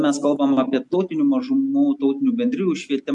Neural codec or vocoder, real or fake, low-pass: none; real; 10.8 kHz